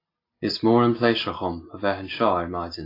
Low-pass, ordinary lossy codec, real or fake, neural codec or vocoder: 5.4 kHz; AAC, 32 kbps; real; none